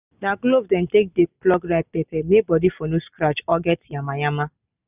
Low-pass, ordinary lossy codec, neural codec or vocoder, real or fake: 3.6 kHz; none; none; real